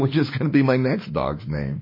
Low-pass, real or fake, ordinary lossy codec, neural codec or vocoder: 5.4 kHz; fake; MP3, 24 kbps; autoencoder, 48 kHz, 32 numbers a frame, DAC-VAE, trained on Japanese speech